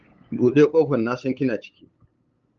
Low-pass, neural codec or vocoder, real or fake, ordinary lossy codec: 7.2 kHz; codec, 16 kHz, 8 kbps, FunCodec, trained on LibriTTS, 25 frames a second; fake; Opus, 24 kbps